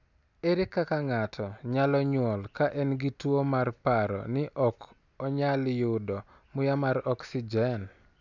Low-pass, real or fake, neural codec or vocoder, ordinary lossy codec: 7.2 kHz; real; none; none